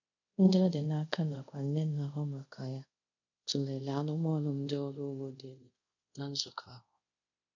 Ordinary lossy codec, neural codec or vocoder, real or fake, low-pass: none; codec, 24 kHz, 0.5 kbps, DualCodec; fake; 7.2 kHz